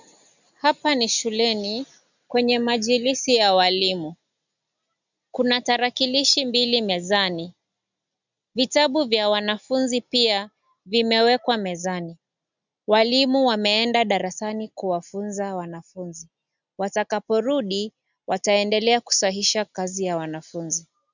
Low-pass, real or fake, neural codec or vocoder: 7.2 kHz; real; none